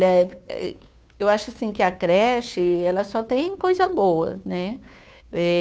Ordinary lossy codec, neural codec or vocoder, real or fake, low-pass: none; codec, 16 kHz, 2 kbps, FunCodec, trained on Chinese and English, 25 frames a second; fake; none